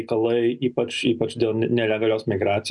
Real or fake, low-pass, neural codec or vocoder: real; 10.8 kHz; none